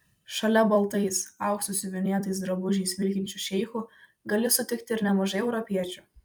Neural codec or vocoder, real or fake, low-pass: vocoder, 44.1 kHz, 128 mel bands every 256 samples, BigVGAN v2; fake; 19.8 kHz